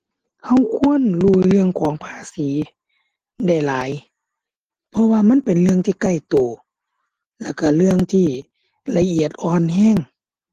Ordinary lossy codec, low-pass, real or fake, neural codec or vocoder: Opus, 16 kbps; 14.4 kHz; real; none